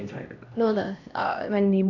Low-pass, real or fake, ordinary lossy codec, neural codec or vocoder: 7.2 kHz; fake; none; codec, 16 kHz, 1 kbps, X-Codec, HuBERT features, trained on LibriSpeech